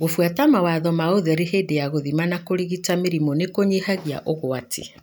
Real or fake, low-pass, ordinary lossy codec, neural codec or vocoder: real; none; none; none